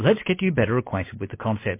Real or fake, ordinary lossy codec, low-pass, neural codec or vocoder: real; MP3, 24 kbps; 3.6 kHz; none